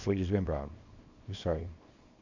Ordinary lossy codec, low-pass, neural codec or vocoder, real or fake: Opus, 64 kbps; 7.2 kHz; codec, 24 kHz, 0.9 kbps, WavTokenizer, small release; fake